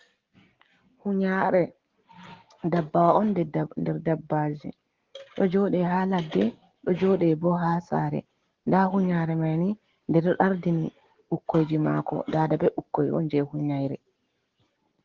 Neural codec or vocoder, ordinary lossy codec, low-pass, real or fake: none; Opus, 16 kbps; 7.2 kHz; real